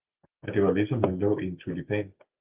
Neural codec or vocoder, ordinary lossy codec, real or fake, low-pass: none; Opus, 24 kbps; real; 3.6 kHz